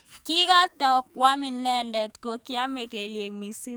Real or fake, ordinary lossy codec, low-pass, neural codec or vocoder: fake; none; none; codec, 44.1 kHz, 2.6 kbps, SNAC